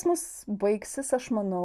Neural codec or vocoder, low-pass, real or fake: none; 14.4 kHz; real